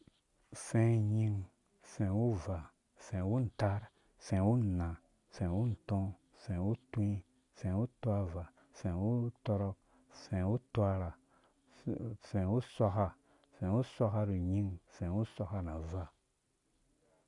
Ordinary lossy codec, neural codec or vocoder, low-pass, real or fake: Opus, 64 kbps; none; 10.8 kHz; real